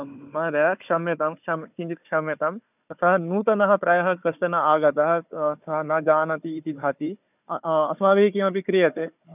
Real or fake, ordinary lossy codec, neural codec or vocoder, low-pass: fake; none; codec, 16 kHz, 4 kbps, FunCodec, trained on Chinese and English, 50 frames a second; 3.6 kHz